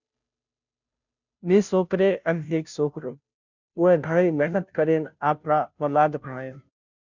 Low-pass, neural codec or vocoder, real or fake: 7.2 kHz; codec, 16 kHz, 0.5 kbps, FunCodec, trained on Chinese and English, 25 frames a second; fake